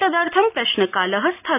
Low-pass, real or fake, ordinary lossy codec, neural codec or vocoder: 3.6 kHz; real; none; none